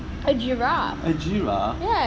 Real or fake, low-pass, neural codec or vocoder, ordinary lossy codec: real; none; none; none